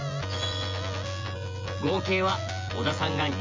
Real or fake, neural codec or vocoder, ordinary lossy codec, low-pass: fake; vocoder, 24 kHz, 100 mel bands, Vocos; MP3, 48 kbps; 7.2 kHz